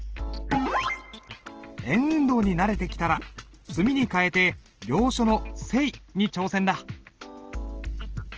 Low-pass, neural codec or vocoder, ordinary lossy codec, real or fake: 7.2 kHz; none; Opus, 16 kbps; real